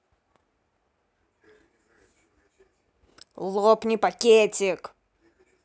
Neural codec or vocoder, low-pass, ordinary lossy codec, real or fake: none; none; none; real